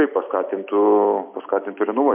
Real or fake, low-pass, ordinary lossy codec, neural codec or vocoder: real; 3.6 kHz; MP3, 32 kbps; none